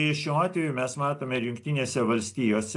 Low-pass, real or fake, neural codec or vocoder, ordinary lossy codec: 10.8 kHz; real; none; AAC, 64 kbps